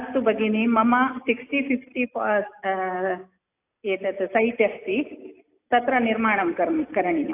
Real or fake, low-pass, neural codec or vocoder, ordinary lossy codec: real; 3.6 kHz; none; AAC, 24 kbps